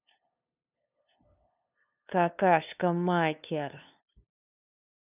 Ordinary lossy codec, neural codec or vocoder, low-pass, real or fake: none; codec, 16 kHz, 2 kbps, FunCodec, trained on LibriTTS, 25 frames a second; 3.6 kHz; fake